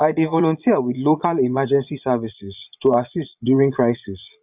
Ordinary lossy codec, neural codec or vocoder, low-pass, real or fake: none; vocoder, 22.05 kHz, 80 mel bands, Vocos; 3.6 kHz; fake